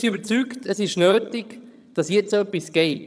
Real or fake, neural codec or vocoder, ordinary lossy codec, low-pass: fake; vocoder, 22.05 kHz, 80 mel bands, HiFi-GAN; none; none